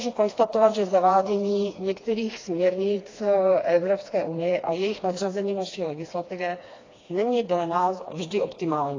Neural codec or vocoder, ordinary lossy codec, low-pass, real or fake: codec, 16 kHz, 2 kbps, FreqCodec, smaller model; AAC, 32 kbps; 7.2 kHz; fake